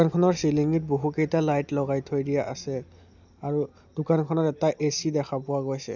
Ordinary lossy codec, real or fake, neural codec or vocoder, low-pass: none; real; none; 7.2 kHz